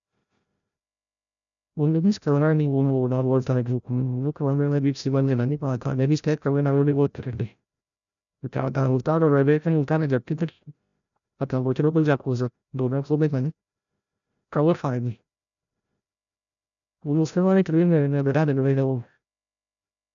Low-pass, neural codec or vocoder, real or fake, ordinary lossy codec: 7.2 kHz; codec, 16 kHz, 0.5 kbps, FreqCodec, larger model; fake; none